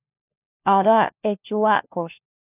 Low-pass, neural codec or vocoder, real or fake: 3.6 kHz; codec, 16 kHz, 1 kbps, FunCodec, trained on LibriTTS, 50 frames a second; fake